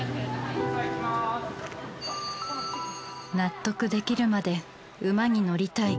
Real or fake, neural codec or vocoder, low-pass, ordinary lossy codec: real; none; none; none